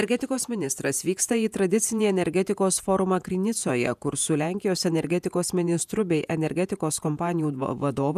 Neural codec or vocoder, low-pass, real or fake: vocoder, 48 kHz, 128 mel bands, Vocos; 14.4 kHz; fake